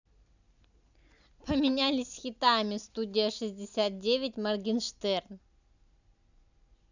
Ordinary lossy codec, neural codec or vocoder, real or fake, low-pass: none; none; real; 7.2 kHz